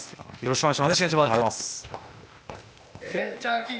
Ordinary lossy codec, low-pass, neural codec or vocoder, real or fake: none; none; codec, 16 kHz, 0.8 kbps, ZipCodec; fake